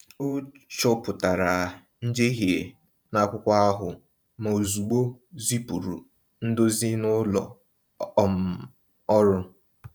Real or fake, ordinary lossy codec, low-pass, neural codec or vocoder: fake; none; 19.8 kHz; vocoder, 44.1 kHz, 128 mel bands every 512 samples, BigVGAN v2